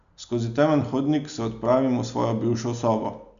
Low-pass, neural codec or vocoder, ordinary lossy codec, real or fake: 7.2 kHz; none; none; real